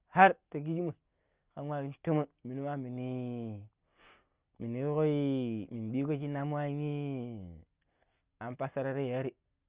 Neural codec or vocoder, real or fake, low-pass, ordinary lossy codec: none; real; 3.6 kHz; Opus, 32 kbps